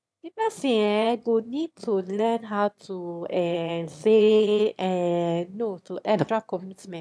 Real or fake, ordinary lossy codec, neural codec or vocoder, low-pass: fake; none; autoencoder, 22.05 kHz, a latent of 192 numbers a frame, VITS, trained on one speaker; none